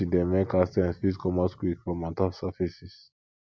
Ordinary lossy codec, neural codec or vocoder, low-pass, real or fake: none; none; none; real